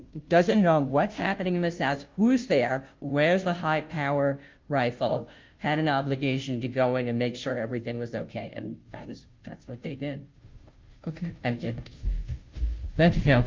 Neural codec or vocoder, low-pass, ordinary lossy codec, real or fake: codec, 16 kHz, 0.5 kbps, FunCodec, trained on Chinese and English, 25 frames a second; 7.2 kHz; Opus, 32 kbps; fake